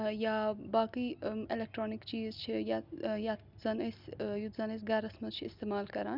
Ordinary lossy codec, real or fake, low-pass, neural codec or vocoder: none; real; 5.4 kHz; none